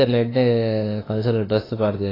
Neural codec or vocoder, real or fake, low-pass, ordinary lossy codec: autoencoder, 48 kHz, 32 numbers a frame, DAC-VAE, trained on Japanese speech; fake; 5.4 kHz; AAC, 24 kbps